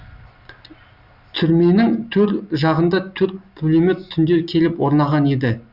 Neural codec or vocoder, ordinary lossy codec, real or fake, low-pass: none; Opus, 64 kbps; real; 5.4 kHz